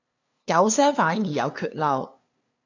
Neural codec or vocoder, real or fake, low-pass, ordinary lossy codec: codec, 16 kHz, 2 kbps, FunCodec, trained on LibriTTS, 25 frames a second; fake; 7.2 kHz; AAC, 48 kbps